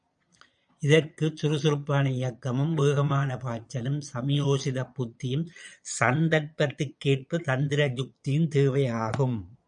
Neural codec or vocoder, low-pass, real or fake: vocoder, 22.05 kHz, 80 mel bands, Vocos; 9.9 kHz; fake